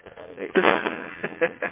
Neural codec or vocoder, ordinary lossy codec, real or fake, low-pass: vocoder, 44.1 kHz, 80 mel bands, Vocos; MP3, 24 kbps; fake; 3.6 kHz